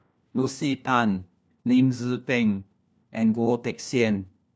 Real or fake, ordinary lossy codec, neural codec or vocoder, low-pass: fake; none; codec, 16 kHz, 1 kbps, FunCodec, trained on LibriTTS, 50 frames a second; none